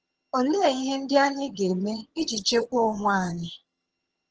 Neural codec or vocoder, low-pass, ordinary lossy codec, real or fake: vocoder, 22.05 kHz, 80 mel bands, HiFi-GAN; 7.2 kHz; Opus, 16 kbps; fake